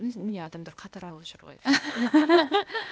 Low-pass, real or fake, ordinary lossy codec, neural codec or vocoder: none; fake; none; codec, 16 kHz, 0.8 kbps, ZipCodec